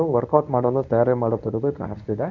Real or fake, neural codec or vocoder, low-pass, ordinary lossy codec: fake; codec, 24 kHz, 0.9 kbps, WavTokenizer, medium speech release version 1; 7.2 kHz; none